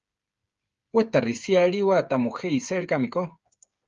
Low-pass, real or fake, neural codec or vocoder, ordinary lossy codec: 7.2 kHz; fake; codec, 16 kHz, 8 kbps, FreqCodec, smaller model; Opus, 32 kbps